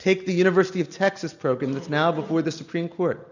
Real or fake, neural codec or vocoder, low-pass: real; none; 7.2 kHz